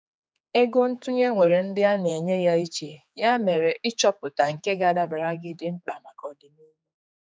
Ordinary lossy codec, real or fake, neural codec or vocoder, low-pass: none; fake; codec, 16 kHz, 4 kbps, X-Codec, HuBERT features, trained on general audio; none